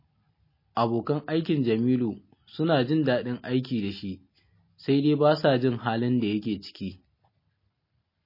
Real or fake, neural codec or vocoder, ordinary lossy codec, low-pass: real; none; MP3, 24 kbps; 5.4 kHz